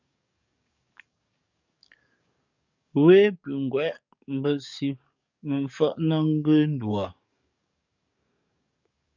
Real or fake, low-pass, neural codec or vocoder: fake; 7.2 kHz; codec, 44.1 kHz, 7.8 kbps, DAC